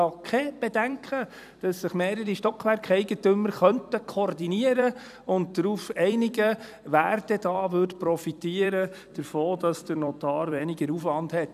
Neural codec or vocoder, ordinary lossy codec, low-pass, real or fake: vocoder, 44.1 kHz, 128 mel bands every 256 samples, BigVGAN v2; none; 14.4 kHz; fake